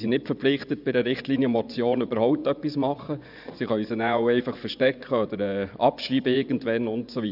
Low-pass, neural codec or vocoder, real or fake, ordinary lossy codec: 5.4 kHz; vocoder, 24 kHz, 100 mel bands, Vocos; fake; none